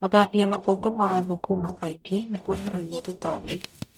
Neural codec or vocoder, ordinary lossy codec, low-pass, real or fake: codec, 44.1 kHz, 0.9 kbps, DAC; none; 19.8 kHz; fake